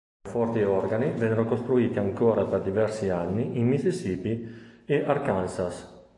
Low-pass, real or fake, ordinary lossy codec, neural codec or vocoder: 10.8 kHz; real; AAC, 48 kbps; none